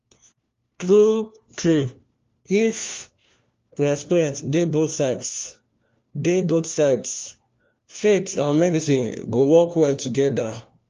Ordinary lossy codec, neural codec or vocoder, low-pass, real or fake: Opus, 32 kbps; codec, 16 kHz, 1 kbps, FunCodec, trained on LibriTTS, 50 frames a second; 7.2 kHz; fake